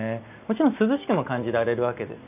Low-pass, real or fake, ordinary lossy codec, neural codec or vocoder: 3.6 kHz; real; none; none